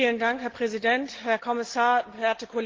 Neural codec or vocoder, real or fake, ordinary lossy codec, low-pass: none; real; Opus, 16 kbps; 7.2 kHz